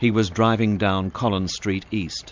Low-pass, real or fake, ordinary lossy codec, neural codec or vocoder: 7.2 kHz; real; MP3, 64 kbps; none